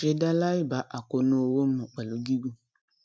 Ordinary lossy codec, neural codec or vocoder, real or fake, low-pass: none; none; real; none